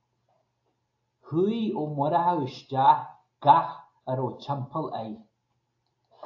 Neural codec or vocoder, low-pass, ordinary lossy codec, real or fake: none; 7.2 kHz; AAC, 48 kbps; real